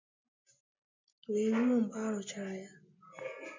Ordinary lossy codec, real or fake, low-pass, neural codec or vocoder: MP3, 64 kbps; real; 7.2 kHz; none